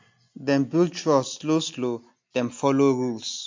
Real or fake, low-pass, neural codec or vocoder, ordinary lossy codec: real; 7.2 kHz; none; MP3, 48 kbps